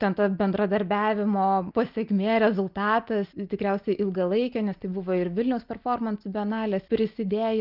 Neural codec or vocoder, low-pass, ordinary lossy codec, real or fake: none; 5.4 kHz; Opus, 32 kbps; real